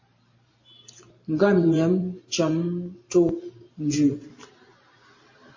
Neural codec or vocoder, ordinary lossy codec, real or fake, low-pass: vocoder, 44.1 kHz, 128 mel bands every 512 samples, BigVGAN v2; MP3, 32 kbps; fake; 7.2 kHz